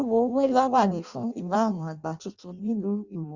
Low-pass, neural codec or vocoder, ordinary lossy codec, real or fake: 7.2 kHz; codec, 16 kHz in and 24 kHz out, 0.6 kbps, FireRedTTS-2 codec; Opus, 64 kbps; fake